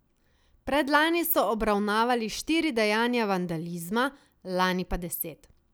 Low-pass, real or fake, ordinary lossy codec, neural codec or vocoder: none; real; none; none